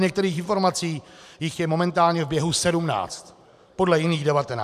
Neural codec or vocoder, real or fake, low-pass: vocoder, 44.1 kHz, 128 mel bands every 512 samples, BigVGAN v2; fake; 14.4 kHz